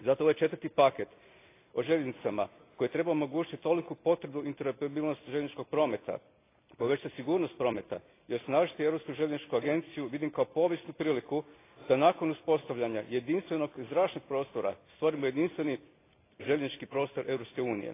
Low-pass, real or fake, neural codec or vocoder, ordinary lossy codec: 3.6 kHz; real; none; AAC, 24 kbps